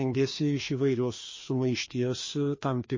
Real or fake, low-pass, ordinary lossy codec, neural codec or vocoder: fake; 7.2 kHz; MP3, 32 kbps; codec, 16 kHz, 2 kbps, FreqCodec, larger model